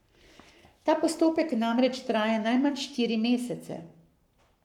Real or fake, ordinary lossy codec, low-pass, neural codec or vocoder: fake; none; 19.8 kHz; codec, 44.1 kHz, 7.8 kbps, Pupu-Codec